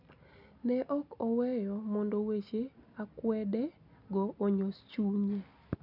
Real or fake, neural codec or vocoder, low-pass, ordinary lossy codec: real; none; 5.4 kHz; none